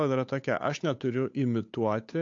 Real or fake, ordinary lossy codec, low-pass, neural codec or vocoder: fake; AAC, 48 kbps; 7.2 kHz; codec, 16 kHz, 8 kbps, FunCodec, trained on Chinese and English, 25 frames a second